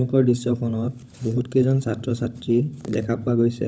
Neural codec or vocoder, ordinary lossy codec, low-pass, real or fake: codec, 16 kHz, 16 kbps, FunCodec, trained on LibriTTS, 50 frames a second; none; none; fake